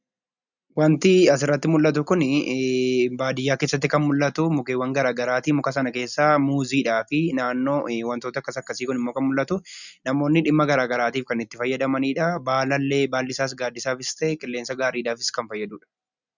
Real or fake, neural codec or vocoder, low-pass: real; none; 7.2 kHz